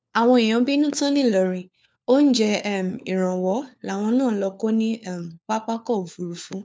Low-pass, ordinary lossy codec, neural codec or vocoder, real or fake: none; none; codec, 16 kHz, 4 kbps, FunCodec, trained on LibriTTS, 50 frames a second; fake